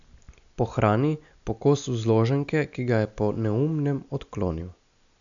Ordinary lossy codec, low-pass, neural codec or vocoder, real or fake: none; 7.2 kHz; none; real